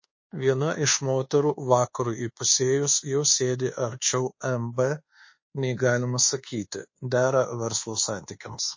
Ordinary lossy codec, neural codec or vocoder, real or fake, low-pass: MP3, 32 kbps; codec, 24 kHz, 1.2 kbps, DualCodec; fake; 7.2 kHz